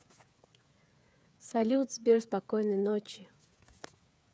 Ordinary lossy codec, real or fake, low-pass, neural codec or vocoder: none; fake; none; codec, 16 kHz, 8 kbps, FreqCodec, smaller model